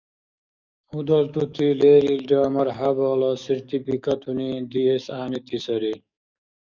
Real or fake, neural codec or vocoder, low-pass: fake; codec, 44.1 kHz, 7.8 kbps, DAC; 7.2 kHz